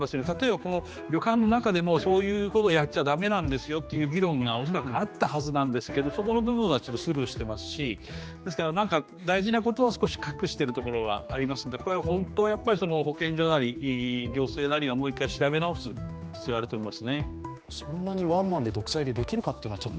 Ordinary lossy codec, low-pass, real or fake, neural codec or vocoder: none; none; fake; codec, 16 kHz, 2 kbps, X-Codec, HuBERT features, trained on general audio